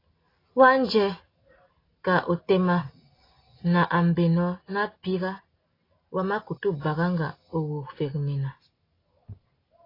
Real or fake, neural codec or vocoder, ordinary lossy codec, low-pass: real; none; AAC, 24 kbps; 5.4 kHz